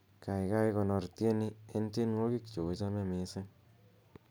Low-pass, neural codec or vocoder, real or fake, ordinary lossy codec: none; none; real; none